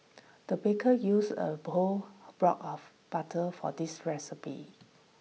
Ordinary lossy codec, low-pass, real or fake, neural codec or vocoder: none; none; real; none